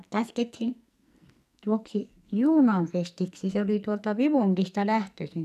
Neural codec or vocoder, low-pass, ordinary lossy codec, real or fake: codec, 44.1 kHz, 2.6 kbps, SNAC; 14.4 kHz; none; fake